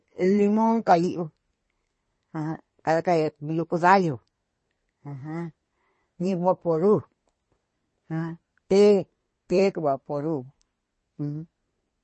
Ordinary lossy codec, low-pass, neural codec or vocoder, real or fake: MP3, 32 kbps; 10.8 kHz; codec, 24 kHz, 1 kbps, SNAC; fake